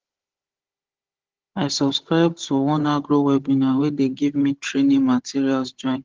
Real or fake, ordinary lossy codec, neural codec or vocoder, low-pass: fake; Opus, 16 kbps; codec, 16 kHz, 16 kbps, FunCodec, trained on Chinese and English, 50 frames a second; 7.2 kHz